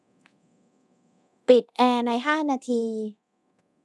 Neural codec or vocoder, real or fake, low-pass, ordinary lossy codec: codec, 24 kHz, 0.9 kbps, DualCodec; fake; none; none